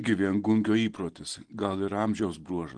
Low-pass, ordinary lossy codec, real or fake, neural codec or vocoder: 9.9 kHz; Opus, 16 kbps; real; none